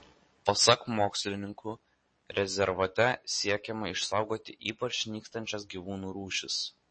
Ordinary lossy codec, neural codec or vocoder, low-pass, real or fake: MP3, 32 kbps; none; 9.9 kHz; real